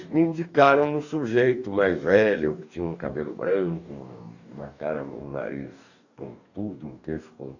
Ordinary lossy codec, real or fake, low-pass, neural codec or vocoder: none; fake; 7.2 kHz; codec, 44.1 kHz, 2.6 kbps, DAC